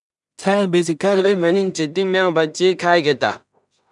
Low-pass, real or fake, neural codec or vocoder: 10.8 kHz; fake; codec, 16 kHz in and 24 kHz out, 0.4 kbps, LongCat-Audio-Codec, two codebook decoder